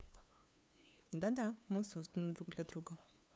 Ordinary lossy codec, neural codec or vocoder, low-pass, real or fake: none; codec, 16 kHz, 2 kbps, FunCodec, trained on LibriTTS, 25 frames a second; none; fake